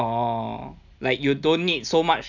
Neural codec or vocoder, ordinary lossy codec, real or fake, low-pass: none; none; real; 7.2 kHz